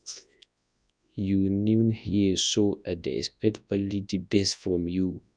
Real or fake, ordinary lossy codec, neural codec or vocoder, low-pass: fake; none; codec, 24 kHz, 0.9 kbps, WavTokenizer, large speech release; 9.9 kHz